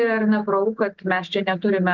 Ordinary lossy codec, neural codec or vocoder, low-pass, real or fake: Opus, 24 kbps; none; 7.2 kHz; real